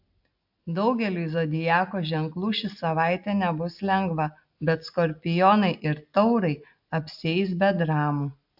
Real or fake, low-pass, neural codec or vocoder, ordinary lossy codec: real; 5.4 kHz; none; AAC, 48 kbps